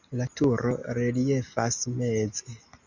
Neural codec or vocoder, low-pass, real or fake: none; 7.2 kHz; real